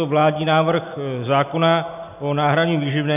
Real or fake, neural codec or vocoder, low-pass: real; none; 3.6 kHz